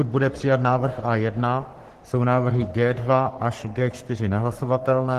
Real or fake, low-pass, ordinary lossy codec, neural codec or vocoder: fake; 14.4 kHz; Opus, 16 kbps; codec, 44.1 kHz, 3.4 kbps, Pupu-Codec